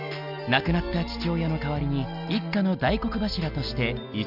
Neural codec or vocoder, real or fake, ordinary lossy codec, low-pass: none; real; none; 5.4 kHz